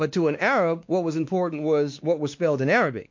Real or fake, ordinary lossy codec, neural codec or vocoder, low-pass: fake; MP3, 48 kbps; codec, 16 kHz, 2 kbps, X-Codec, WavLM features, trained on Multilingual LibriSpeech; 7.2 kHz